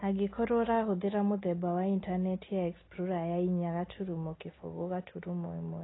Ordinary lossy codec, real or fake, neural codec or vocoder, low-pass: AAC, 16 kbps; real; none; 7.2 kHz